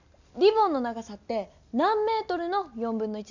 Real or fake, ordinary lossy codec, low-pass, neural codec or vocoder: real; none; 7.2 kHz; none